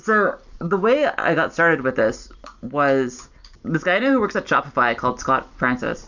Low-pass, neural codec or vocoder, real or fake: 7.2 kHz; none; real